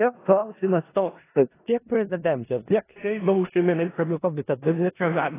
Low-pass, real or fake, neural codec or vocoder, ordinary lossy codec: 3.6 kHz; fake; codec, 16 kHz in and 24 kHz out, 0.4 kbps, LongCat-Audio-Codec, four codebook decoder; AAC, 16 kbps